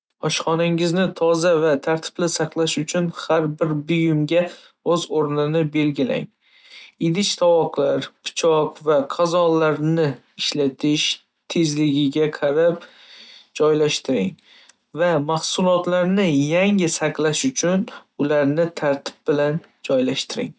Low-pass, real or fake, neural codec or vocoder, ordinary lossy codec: none; real; none; none